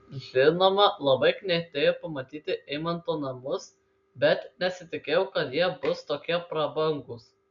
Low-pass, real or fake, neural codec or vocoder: 7.2 kHz; real; none